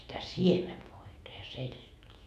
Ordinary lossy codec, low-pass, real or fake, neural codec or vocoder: none; 14.4 kHz; fake; autoencoder, 48 kHz, 128 numbers a frame, DAC-VAE, trained on Japanese speech